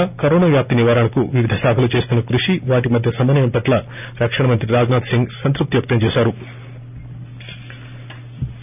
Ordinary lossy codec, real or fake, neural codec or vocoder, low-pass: none; real; none; 3.6 kHz